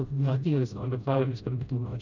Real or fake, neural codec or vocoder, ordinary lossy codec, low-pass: fake; codec, 16 kHz, 0.5 kbps, FreqCodec, smaller model; none; 7.2 kHz